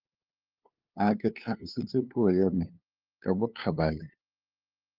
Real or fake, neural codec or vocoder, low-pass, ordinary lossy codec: fake; codec, 16 kHz, 2 kbps, FunCodec, trained on LibriTTS, 25 frames a second; 5.4 kHz; Opus, 24 kbps